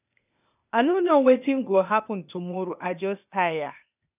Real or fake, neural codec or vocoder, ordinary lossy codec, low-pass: fake; codec, 16 kHz, 0.8 kbps, ZipCodec; none; 3.6 kHz